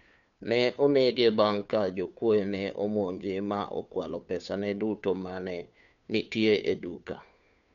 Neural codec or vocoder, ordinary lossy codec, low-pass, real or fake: codec, 16 kHz, 2 kbps, FunCodec, trained on Chinese and English, 25 frames a second; none; 7.2 kHz; fake